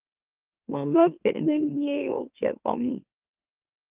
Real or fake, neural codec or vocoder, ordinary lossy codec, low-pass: fake; autoencoder, 44.1 kHz, a latent of 192 numbers a frame, MeloTTS; Opus, 24 kbps; 3.6 kHz